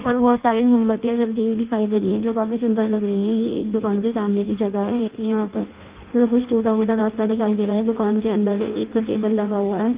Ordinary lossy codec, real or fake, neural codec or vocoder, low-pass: Opus, 32 kbps; fake; codec, 16 kHz in and 24 kHz out, 0.6 kbps, FireRedTTS-2 codec; 3.6 kHz